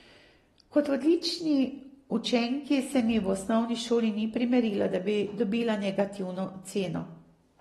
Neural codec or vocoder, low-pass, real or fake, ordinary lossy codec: none; 19.8 kHz; real; AAC, 32 kbps